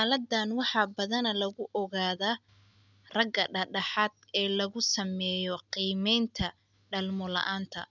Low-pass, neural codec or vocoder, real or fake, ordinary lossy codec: 7.2 kHz; none; real; none